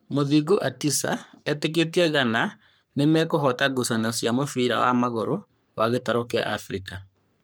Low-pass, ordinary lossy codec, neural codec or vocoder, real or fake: none; none; codec, 44.1 kHz, 3.4 kbps, Pupu-Codec; fake